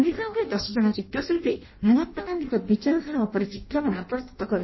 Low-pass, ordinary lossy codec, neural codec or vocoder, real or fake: 7.2 kHz; MP3, 24 kbps; codec, 16 kHz in and 24 kHz out, 0.6 kbps, FireRedTTS-2 codec; fake